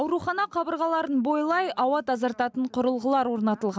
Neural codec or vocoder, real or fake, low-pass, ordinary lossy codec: none; real; none; none